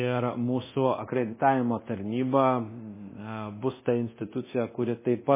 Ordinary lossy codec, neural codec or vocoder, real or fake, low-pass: MP3, 16 kbps; codec, 24 kHz, 0.9 kbps, DualCodec; fake; 3.6 kHz